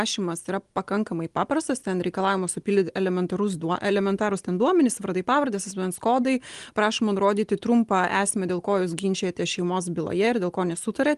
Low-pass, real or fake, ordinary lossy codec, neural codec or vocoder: 10.8 kHz; real; Opus, 24 kbps; none